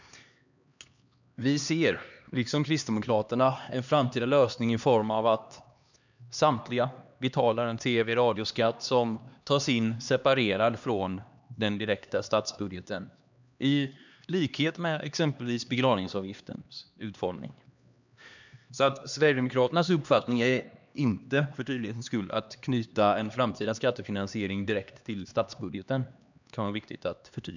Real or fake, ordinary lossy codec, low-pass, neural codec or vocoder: fake; none; 7.2 kHz; codec, 16 kHz, 2 kbps, X-Codec, HuBERT features, trained on LibriSpeech